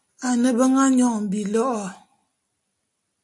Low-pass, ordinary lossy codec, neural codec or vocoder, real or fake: 10.8 kHz; MP3, 48 kbps; none; real